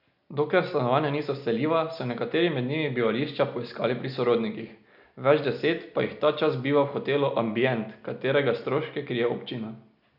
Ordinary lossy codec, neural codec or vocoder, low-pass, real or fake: none; none; 5.4 kHz; real